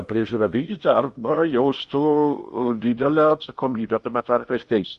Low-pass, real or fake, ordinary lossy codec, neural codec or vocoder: 10.8 kHz; fake; Opus, 64 kbps; codec, 16 kHz in and 24 kHz out, 0.8 kbps, FocalCodec, streaming, 65536 codes